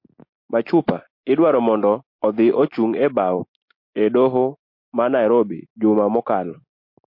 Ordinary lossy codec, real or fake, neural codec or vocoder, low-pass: MP3, 32 kbps; real; none; 5.4 kHz